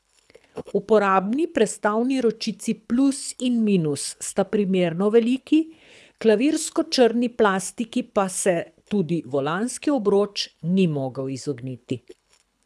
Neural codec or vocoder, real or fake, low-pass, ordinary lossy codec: codec, 24 kHz, 6 kbps, HILCodec; fake; none; none